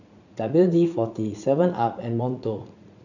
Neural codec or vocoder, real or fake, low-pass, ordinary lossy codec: vocoder, 44.1 kHz, 80 mel bands, Vocos; fake; 7.2 kHz; none